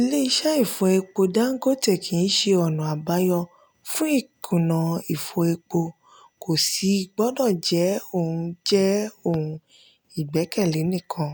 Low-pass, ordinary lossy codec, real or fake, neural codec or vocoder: none; none; real; none